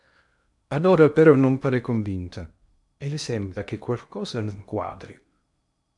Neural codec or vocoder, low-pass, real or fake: codec, 16 kHz in and 24 kHz out, 0.8 kbps, FocalCodec, streaming, 65536 codes; 10.8 kHz; fake